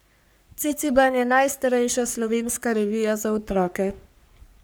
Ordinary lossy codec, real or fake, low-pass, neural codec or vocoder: none; fake; none; codec, 44.1 kHz, 3.4 kbps, Pupu-Codec